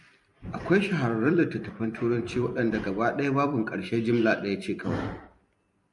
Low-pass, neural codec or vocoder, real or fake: 10.8 kHz; vocoder, 44.1 kHz, 128 mel bands every 256 samples, BigVGAN v2; fake